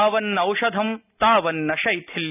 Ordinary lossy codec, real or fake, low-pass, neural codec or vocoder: none; real; 3.6 kHz; none